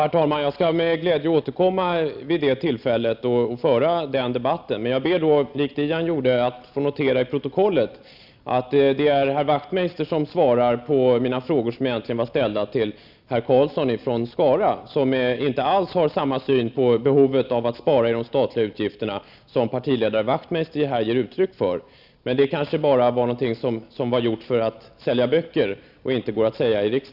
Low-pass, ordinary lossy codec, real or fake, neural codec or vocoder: 5.4 kHz; none; real; none